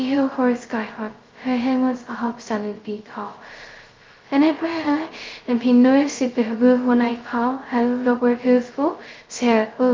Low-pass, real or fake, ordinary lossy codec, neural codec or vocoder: 7.2 kHz; fake; Opus, 32 kbps; codec, 16 kHz, 0.2 kbps, FocalCodec